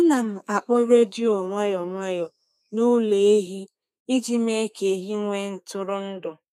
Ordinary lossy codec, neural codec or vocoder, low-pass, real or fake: none; codec, 32 kHz, 1.9 kbps, SNAC; 14.4 kHz; fake